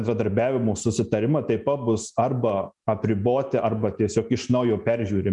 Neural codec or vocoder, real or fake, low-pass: none; real; 10.8 kHz